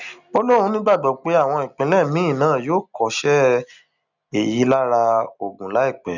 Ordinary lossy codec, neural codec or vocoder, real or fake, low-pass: none; none; real; 7.2 kHz